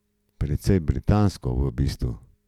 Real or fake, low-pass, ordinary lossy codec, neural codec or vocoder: real; 19.8 kHz; none; none